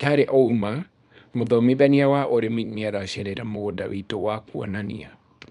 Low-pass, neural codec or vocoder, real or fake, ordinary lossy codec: 10.8 kHz; codec, 24 kHz, 0.9 kbps, WavTokenizer, small release; fake; none